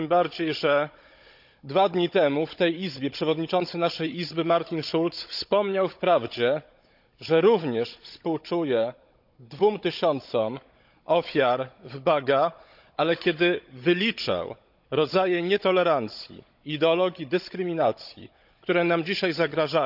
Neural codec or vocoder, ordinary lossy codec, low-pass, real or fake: codec, 16 kHz, 16 kbps, FunCodec, trained on Chinese and English, 50 frames a second; none; 5.4 kHz; fake